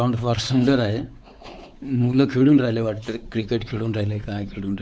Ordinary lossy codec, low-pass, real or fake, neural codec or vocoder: none; none; fake; codec, 16 kHz, 4 kbps, X-Codec, WavLM features, trained on Multilingual LibriSpeech